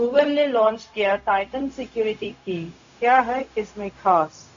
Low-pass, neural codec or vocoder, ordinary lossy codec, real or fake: 7.2 kHz; codec, 16 kHz, 0.4 kbps, LongCat-Audio-Codec; Opus, 64 kbps; fake